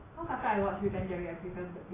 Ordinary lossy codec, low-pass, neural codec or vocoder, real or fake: AAC, 16 kbps; 3.6 kHz; none; real